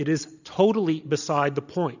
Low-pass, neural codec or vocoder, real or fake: 7.2 kHz; none; real